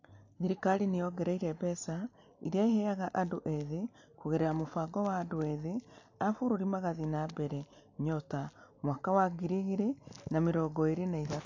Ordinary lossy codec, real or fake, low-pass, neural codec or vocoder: AAC, 48 kbps; real; 7.2 kHz; none